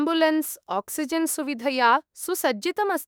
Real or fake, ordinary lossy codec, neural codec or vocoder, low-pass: fake; none; autoencoder, 48 kHz, 32 numbers a frame, DAC-VAE, trained on Japanese speech; none